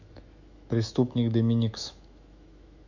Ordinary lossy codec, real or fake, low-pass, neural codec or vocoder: none; fake; 7.2 kHz; autoencoder, 48 kHz, 128 numbers a frame, DAC-VAE, trained on Japanese speech